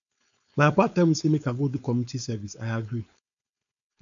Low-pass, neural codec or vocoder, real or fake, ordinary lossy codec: 7.2 kHz; codec, 16 kHz, 4.8 kbps, FACodec; fake; none